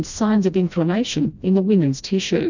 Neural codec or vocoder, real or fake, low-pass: codec, 16 kHz, 1 kbps, FreqCodec, smaller model; fake; 7.2 kHz